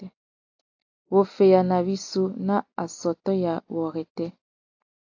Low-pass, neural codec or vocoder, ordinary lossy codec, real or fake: 7.2 kHz; none; AAC, 48 kbps; real